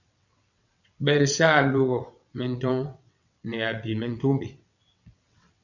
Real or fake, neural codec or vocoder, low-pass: fake; vocoder, 22.05 kHz, 80 mel bands, WaveNeXt; 7.2 kHz